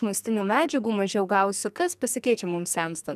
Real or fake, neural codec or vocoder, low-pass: fake; codec, 44.1 kHz, 2.6 kbps, SNAC; 14.4 kHz